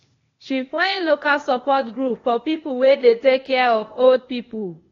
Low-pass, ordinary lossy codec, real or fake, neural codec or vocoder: 7.2 kHz; AAC, 32 kbps; fake; codec, 16 kHz, 0.8 kbps, ZipCodec